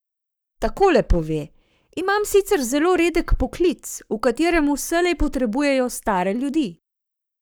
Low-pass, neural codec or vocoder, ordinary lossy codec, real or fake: none; codec, 44.1 kHz, 7.8 kbps, Pupu-Codec; none; fake